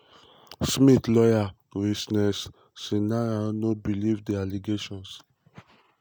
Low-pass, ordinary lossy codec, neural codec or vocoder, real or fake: none; none; none; real